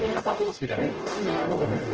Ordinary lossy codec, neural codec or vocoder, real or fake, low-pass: Opus, 16 kbps; codec, 44.1 kHz, 0.9 kbps, DAC; fake; 7.2 kHz